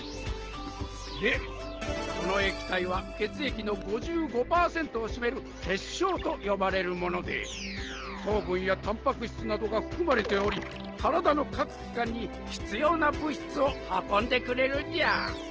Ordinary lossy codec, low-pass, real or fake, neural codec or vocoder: Opus, 16 kbps; 7.2 kHz; real; none